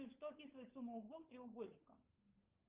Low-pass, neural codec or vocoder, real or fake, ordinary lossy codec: 3.6 kHz; codec, 16 kHz, 16 kbps, FunCodec, trained on LibriTTS, 50 frames a second; fake; Opus, 32 kbps